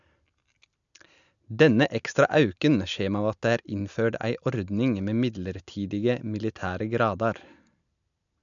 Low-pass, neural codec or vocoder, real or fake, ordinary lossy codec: 7.2 kHz; none; real; none